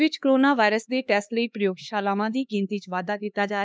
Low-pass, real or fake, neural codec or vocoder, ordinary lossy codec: none; fake; codec, 16 kHz, 2 kbps, X-Codec, HuBERT features, trained on LibriSpeech; none